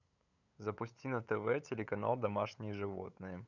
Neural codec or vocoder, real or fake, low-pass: codec, 16 kHz, 8 kbps, FunCodec, trained on LibriTTS, 25 frames a second; fake; 7.2 kHz